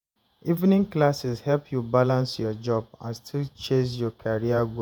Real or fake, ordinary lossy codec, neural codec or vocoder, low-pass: fake; none; vocoder, 48 kHz, 128 mel bands, Vocos; none